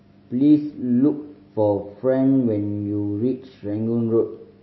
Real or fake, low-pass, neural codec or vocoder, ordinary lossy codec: real; 7.2 kHz; none; MP3, 24 kbps